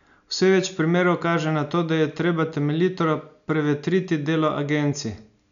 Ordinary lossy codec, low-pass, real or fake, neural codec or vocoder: none; 7.2 kHz; real; none